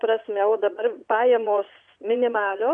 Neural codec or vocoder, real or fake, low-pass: vocoder, 22.05 kHz, 80 mel bands, Vocos; fake; 9.9 kHz